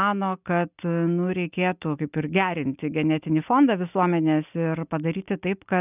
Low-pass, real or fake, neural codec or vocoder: 3.6 kHz; real; none